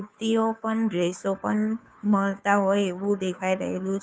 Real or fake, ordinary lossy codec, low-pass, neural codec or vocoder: fake; none; none; codec, 16 kHz, 2 kbps, FunCodec, trained on Chinese and English, 25 frames a second